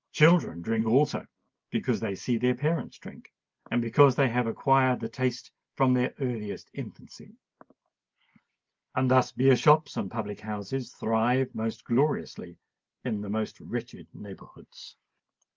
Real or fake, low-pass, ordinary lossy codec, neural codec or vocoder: real; 7.2 kHz; Opus, 24 kbps; none